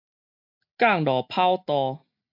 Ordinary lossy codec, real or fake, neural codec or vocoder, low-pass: MP3, 48 kbps; real; none; 5.4 kHz